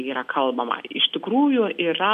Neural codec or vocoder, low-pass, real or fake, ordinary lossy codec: none; 14.4 kHz; real; MP3, 96 kbps